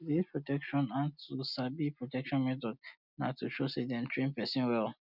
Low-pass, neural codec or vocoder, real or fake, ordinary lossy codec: 5.4 kHz; none; real; none